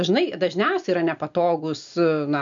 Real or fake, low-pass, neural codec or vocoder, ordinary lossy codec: real; 7.2 kHz; none; MP3, 64 kbps